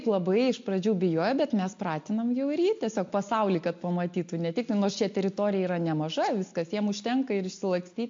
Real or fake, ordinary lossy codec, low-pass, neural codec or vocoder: real; MP3, 48 kbps; 7.2 kHz; none